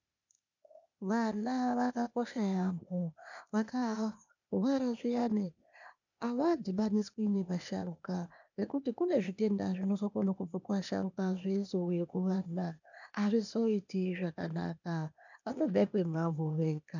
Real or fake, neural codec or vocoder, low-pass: fake; codec, 16 kHz, 0.8 kbps, ZipCodec; 7.2 kHz